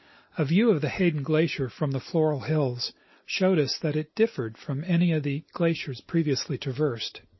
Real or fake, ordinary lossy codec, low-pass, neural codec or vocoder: real; MP3, 24 kbps; 7.2 kHz; none